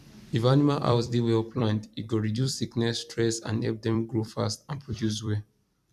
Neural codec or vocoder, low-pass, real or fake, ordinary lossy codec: vocoder, 44.1 kHz, 128 mel bands every 256 samples, BigVGAN v2; 14.4 kHz; fake; none